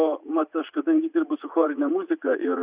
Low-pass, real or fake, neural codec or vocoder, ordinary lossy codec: 3.6 kHz; fake; vocoder, 44.1 kHz, 80 mel bands, Vocos; Opus, 64 kbps